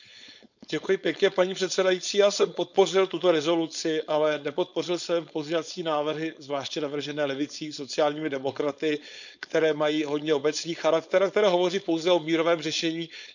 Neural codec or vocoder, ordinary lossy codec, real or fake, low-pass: codec, 16 kHz, 4.8 kbps, FACodec; none; fake; 7.2 kHz